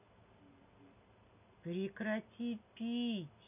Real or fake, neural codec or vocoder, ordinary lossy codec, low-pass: real; none; none; 3.6 kHz